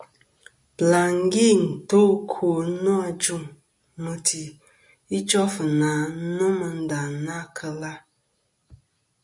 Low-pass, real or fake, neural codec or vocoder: 10.8 kHz; real; none